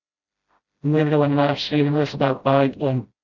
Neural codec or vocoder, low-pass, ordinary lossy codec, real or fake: codec, 16 kHz, 0.5 kbps, FreqCodec, smaller model; 7.2 kHz; Opus, 64 kbps; fake